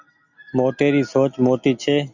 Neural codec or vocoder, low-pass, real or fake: none; 7.2 kHz; real